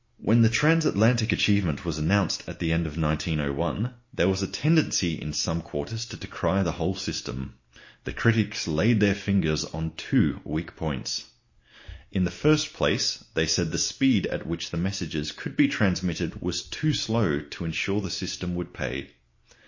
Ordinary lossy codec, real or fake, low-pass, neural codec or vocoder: MP3, 32 kbps; real; 7.2 kHz; none